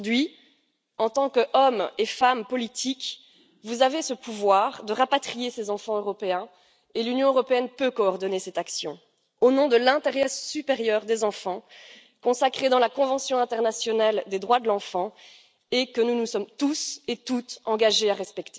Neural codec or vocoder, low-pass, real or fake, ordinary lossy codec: none; none; real; none